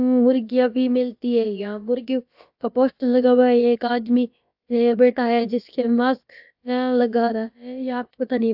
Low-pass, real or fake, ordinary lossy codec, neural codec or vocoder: 5.4 kHz; fake; AAC, 48 kbps; codec, 16 kHz, about 1 kbps, DyCAST, with the encoder's durations